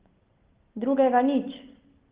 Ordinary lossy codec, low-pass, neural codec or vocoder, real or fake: Opus, 16 kbps; 3.6 kHz; none; real